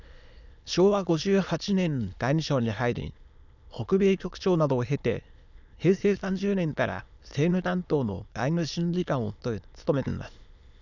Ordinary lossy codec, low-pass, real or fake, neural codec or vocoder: none; 7.2 kHz; fake; autoencoder, 22.05 kHz, a latent of 192 numbers a frame, VITS, trained on many speakers